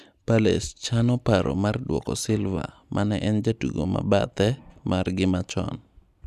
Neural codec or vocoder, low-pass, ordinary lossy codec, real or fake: none; 14.4 kHz; none; real